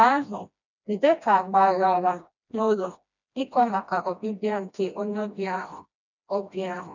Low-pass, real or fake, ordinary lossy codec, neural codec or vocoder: 7.2 kHz; fake; none; codec, 16 kHz, 1 kbps, FreqCodec, smaller model